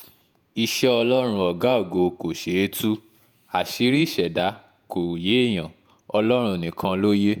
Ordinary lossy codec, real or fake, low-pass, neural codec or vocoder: none; fake; none; vocoder, 48 kHz, 128 mel bands, Vocos